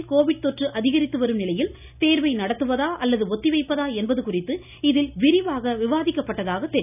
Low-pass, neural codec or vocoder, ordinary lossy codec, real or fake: 3.6 kHz; none; none; real